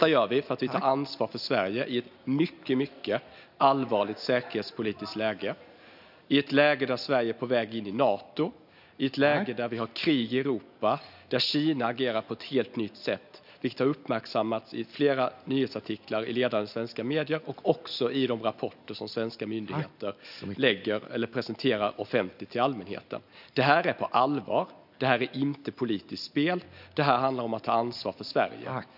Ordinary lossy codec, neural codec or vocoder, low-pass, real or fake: none; none; 5.4 kHz; real